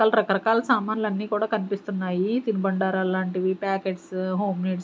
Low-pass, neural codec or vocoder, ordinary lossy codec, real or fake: none; none; none; real